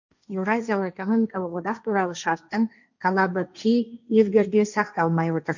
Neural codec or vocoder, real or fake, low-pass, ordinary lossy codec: codec, 16 kHz, 1.1 kbps, Voila-Tokenizer; fake; 7.2 kHz; none